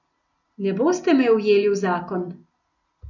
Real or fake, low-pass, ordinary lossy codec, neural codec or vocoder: real; 7.2 kHz; none; none